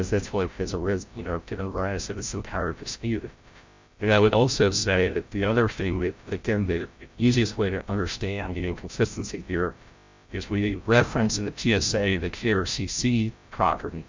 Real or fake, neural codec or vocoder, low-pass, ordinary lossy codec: fake; codec, 16 kHz, 0.5 kbps, FreqCodec, larger model; 7.2 kHz; MP3, 64 kbps